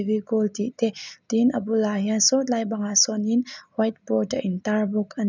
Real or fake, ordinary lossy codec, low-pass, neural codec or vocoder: real; none; 7.2 kHz; none